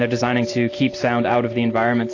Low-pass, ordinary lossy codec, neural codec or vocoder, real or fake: 7.2 kHz; AAC, 32 kbps; none; real